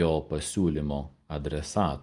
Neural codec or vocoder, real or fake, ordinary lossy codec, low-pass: none; real; Opus, 32 kbps; 10.8 kHz